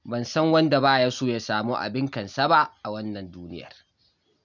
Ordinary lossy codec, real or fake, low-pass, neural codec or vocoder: none; real; 7.2 kHz; none